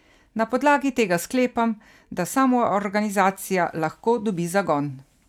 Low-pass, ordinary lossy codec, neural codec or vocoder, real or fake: 19.8 kHz; none; none; real